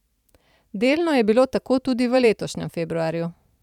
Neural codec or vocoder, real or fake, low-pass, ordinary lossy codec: vocoder, 44.1 kHz, 128 mel bands every 512 samples, BigVGAN v2; fake; 19.8 kHz; none